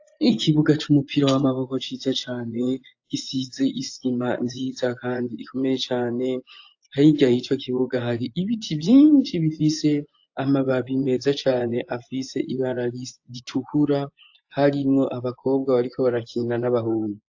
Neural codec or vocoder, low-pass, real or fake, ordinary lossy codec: vocoder, 24 kHz, 100 mel bands, Vocos; 7.2 kHz; fake; AAC, 48 kbps